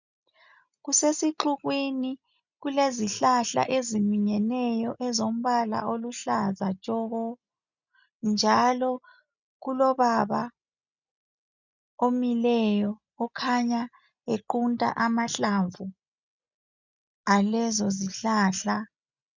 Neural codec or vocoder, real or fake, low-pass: none; real; 7.2 kHz